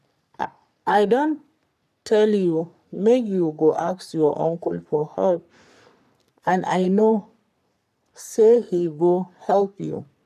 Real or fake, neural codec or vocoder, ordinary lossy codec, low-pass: fake; codec, 44.1 kHz, 3.4 kbps, Pupu-Codec; none; 14.4 kHz